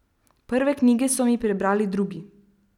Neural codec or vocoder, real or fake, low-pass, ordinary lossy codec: none; real; 19.8 kHz; none